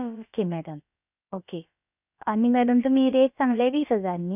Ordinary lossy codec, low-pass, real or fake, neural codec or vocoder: none; 3.6 kHz; fake; codec, 16 kHz, about 1 kbps, DyCAST, with the encoder's durations